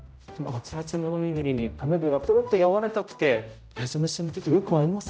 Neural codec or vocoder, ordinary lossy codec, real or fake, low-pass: codec, 16 kHz, 0.5 kbps, X-Codec, HuBERT features, trained on general audio; none; fake; none